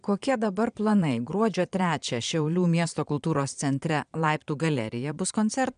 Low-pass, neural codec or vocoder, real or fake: 9.9 kHz; vocoder, 22.05 kHz, 80 mel bands, Vocos; fake